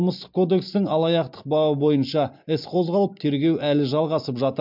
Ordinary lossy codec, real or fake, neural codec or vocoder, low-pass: MP3, 48 kbps; real; none; 5.4 kHz